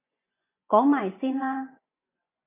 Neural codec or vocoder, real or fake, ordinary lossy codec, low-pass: vocoder, 24 kHz, 100 mel bands, Vocos; fake; MP3, 24 kbps; 3.6 kHz